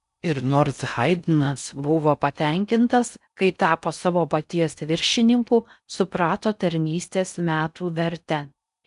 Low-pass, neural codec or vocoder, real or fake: 10.8 kHz; codec, 16 kHz in and 24 kHz out, 0.6 kbps, FocalCodec, streaming, 4096 codes; fake